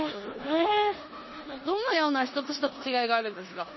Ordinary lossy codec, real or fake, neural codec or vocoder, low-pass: MP3, 24 kbps; fake; codec, 16 kHz in and 24 kHz out, 0.9 kbps, LongCat-Audio-Codec, four codebook decoder; 7.2 kHz